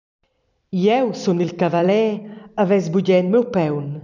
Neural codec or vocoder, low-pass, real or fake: vocoder, 44.1 kHz, 128 mel bands every 256 samples, BigVGAN v2; 7.2 kHz; fake